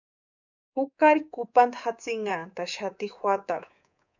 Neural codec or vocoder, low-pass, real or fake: codec, 24 kHz, 3.1 kbps, DualCodec; 7.2 kHz; fake